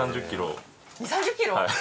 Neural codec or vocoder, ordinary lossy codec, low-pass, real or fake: none; none; none; real